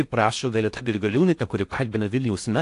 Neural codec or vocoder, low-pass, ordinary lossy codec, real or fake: codec, 16 kHz in and 24 kHz out, 0.6 kbps, FocalCodec, streaming, 4096 codes; 10.8 kHz; AAC, 64 kbps; fake